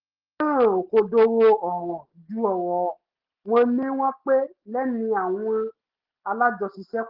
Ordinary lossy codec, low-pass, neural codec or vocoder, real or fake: Opus, 16 kbps; 5.4 kHz; none; real